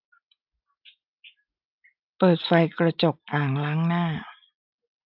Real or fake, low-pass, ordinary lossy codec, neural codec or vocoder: real; 5.4 kHz; AAC, 48 kbps; none